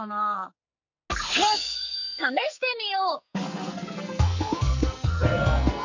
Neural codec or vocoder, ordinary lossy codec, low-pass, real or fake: codec, 44.1 kHz, 2.6 kbps, SNAC; none; 7.2 kHz; fake